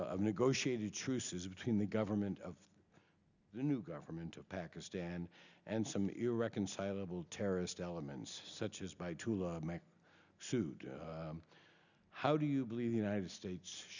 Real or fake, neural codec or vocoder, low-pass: real; none; 7.2 kHz